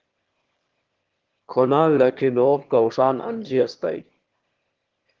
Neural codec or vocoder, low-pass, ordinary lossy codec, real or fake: autoencoder, 22.05 kHz, a latent of 192 numbers a frame, VITS, trained on one speaker; 7.2 kHz; Opus, 16 kbps; fake